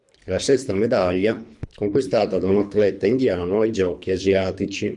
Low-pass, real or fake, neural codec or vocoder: 10.8 kHz; fake; codec, 24 kHz, 3 kbps, HILCodec